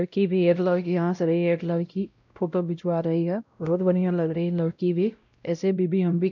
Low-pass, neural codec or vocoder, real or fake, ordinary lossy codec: 7.2 kHz; codec, 16 kHz, 0.5 kbps, X-Codec, WavLM features, trained on Multilingual LibriSpeech; fake; none